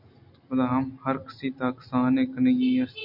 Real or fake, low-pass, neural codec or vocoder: real; 5.4 kHz; none